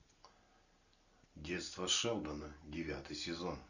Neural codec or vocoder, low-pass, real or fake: none; 7.2 kHz; real